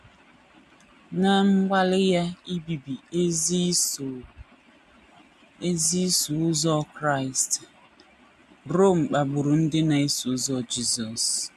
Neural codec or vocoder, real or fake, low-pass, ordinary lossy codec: none; real; 14.4 kHz; none